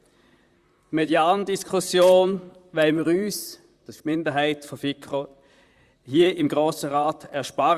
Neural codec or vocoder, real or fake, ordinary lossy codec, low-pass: vocoder, 44.1 kHz, 128 mel bands, Pupu-Vocoder; fake; Opus, 64 kbps; 14.4 kHz